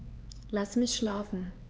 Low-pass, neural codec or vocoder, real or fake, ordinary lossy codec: none; codec, 16 kHz, 4 kbps, X-Codec, HuBERT features, trained on LibriSpeech; fake; none